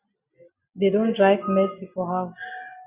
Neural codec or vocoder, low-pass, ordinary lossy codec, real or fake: none; 3.6 kHz; Opus, 64 kbps; real